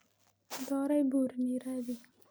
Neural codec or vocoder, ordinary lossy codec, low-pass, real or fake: none; none; none; real